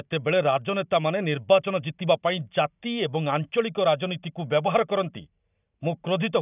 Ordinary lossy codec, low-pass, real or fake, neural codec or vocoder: none; 3.6 kHz; real; none